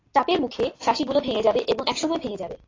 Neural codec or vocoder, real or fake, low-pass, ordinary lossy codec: none; real; 7.2 kHz; AAC, 32 kbps